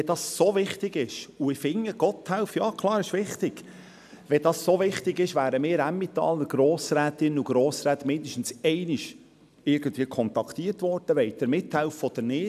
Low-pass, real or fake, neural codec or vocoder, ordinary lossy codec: 14.4 kHz; real; none; none